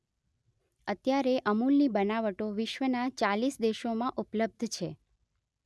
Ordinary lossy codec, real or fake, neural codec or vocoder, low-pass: none; real; none; none